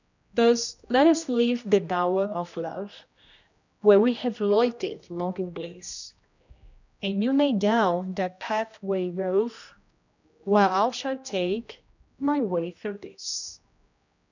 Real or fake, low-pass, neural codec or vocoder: fake; 7.2 kHz; codec, 16 kHz, 1 kbps, X-Codec, HuBERT features, trained on general audio